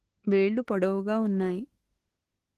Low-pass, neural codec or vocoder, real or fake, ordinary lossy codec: 14.4 kHz; autoencoder, 48 kHz, 32 numbers a frame, DAC-VAE, trained on Japanese speech; fake; Opus, 16 kbps